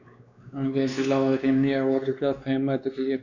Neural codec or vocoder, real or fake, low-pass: codec, 16 kHz, 2 kbps, X-Codec, WavLM features, trained on Multilingual LibriSpeech; fake; 7.2 kHz